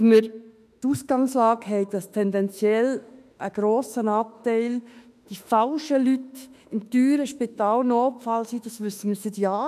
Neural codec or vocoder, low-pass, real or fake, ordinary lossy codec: autoencoder, 48 kHz, 32 numbers a frame, DAC-VAE, trained on Japanese speech; 14.4 kHz; fake; none